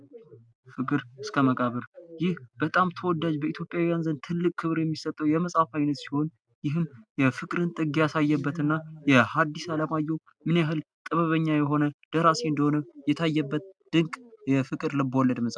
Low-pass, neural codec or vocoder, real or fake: 9.9 kHz; none; real